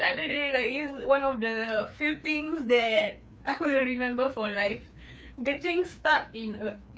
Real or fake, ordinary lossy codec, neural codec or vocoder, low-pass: fake; none; codec, 16 kHz, 2 kbps, FreqCodec, larger model; none